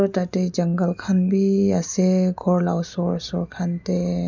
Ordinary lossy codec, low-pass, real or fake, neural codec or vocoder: none; 7.2 kHz; real; none